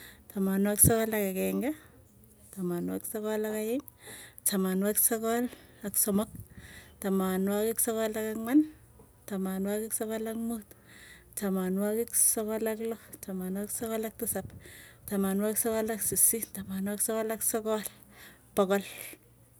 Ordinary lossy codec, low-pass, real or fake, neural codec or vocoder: none; none; real; none